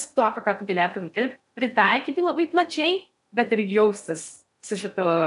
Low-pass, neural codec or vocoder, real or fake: 10.8 kHz; codec, 16 kHz in and 24 kHz out, 0.6 kbps, FocalCodec, streaming, 4096 codes; fake